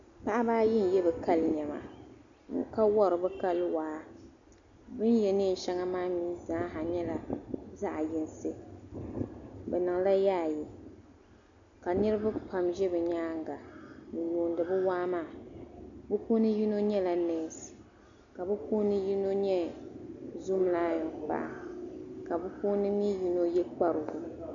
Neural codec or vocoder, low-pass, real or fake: none; 7.2 kHz; real